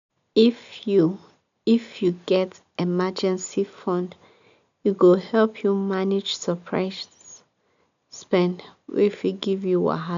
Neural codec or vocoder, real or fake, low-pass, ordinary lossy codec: none; real; 7.2 kHz; none